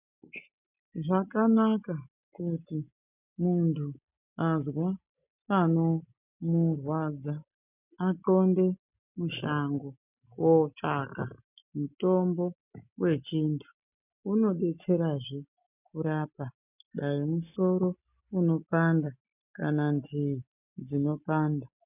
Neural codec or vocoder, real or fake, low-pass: none; real; 3.6 kHz